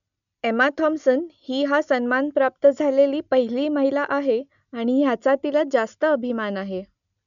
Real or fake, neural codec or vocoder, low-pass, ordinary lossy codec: real; none; 7.2 kHz; none